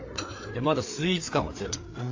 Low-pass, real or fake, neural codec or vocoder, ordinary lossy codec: 7.2 kHz; fake; codec, 16 kHz, 4 kbps, FreqCodec, larger model; AAC, 48 kbps